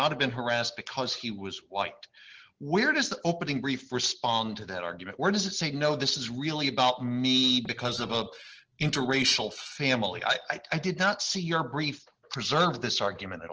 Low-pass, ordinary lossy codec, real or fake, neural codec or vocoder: 7.2 kHz; Opus, 16 kbps; real; none